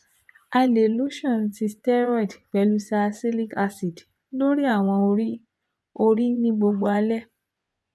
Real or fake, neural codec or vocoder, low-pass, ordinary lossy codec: fake; vocoder, 24 kHz, 100 mel bands, Vocos; none; none